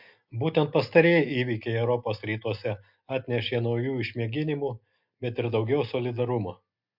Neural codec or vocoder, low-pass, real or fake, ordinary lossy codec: none; 5.4 kHz; real; MP3, 48 kbps